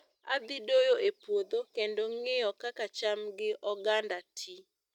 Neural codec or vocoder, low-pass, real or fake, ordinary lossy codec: none; 19.8 kHz; real; none